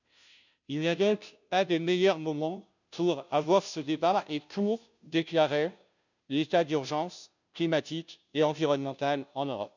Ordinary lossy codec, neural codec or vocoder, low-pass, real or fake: none; codec, 16 kHz, 0.5 kbps, FunCodec, trained on Chinese and English, 25 frames a second; 7.2 kHz; fake